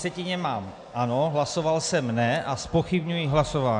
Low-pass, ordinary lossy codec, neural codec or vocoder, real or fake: 9.9 kHz; MP3, 64 kbps; none; real